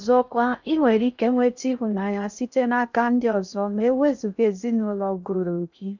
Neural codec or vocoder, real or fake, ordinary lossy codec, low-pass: codec, 16 kHz in and 24 kHz out, 0.6 kbps, FocalCodec, streaming, 4096 codes; fake; none; 7.2 kHz